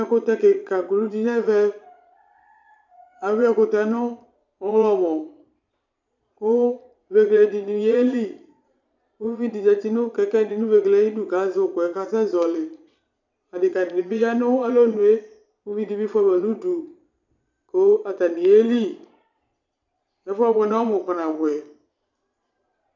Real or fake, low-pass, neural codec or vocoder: fake; 7.2 kHz; vocoder, 22.05 kHz, 80 mel bands, Vocos